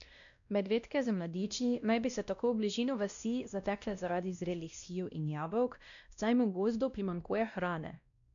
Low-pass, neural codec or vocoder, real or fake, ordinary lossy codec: 7.2 kHz; codec, 16 kHz, 1 kbps, X-Codec, WavLM features, trained on Multilingual LibriSpeech; fake; none